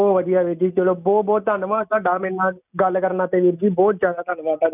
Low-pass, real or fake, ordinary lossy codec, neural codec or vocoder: 3.6 kHz; real; none; none